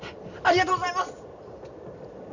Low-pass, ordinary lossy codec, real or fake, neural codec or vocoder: 7.2 kHz; none; real; none